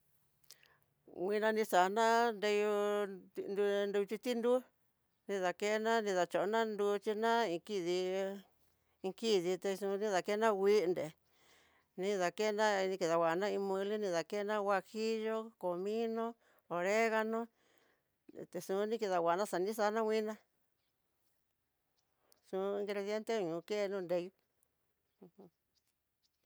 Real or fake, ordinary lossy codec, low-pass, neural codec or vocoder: real; none; none; none